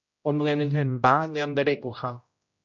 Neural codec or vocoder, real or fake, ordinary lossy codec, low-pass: codec, 16 kHz, 0.5 kbps, X-Codec, HuBERT features, trained on general audio; fake; MP3, 48 kbps; 7.2 kHz